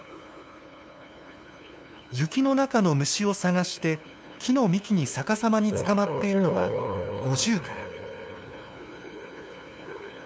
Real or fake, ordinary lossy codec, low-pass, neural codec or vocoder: fake; none; none; codec, 16 kHz, 2 kbps, FunCodec, trained on LibriTTS, 25 frames a second